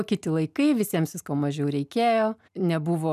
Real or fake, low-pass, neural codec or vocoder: real; 14.4 kHz; none